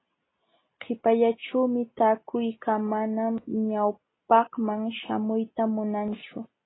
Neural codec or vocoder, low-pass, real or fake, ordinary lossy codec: none; 7.2 kHz; real; AAC, 16 kbps